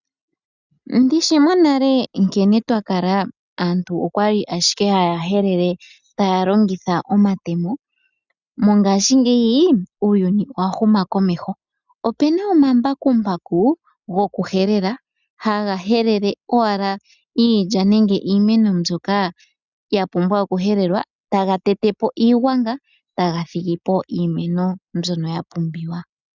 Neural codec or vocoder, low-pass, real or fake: none; 7.2 kHz; real